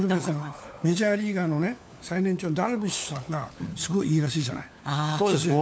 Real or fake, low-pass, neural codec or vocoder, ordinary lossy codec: fake; none; codec, 16 kHz, 4 kbps, FunCodec, trained on LibriTTS, 50 frames a second; none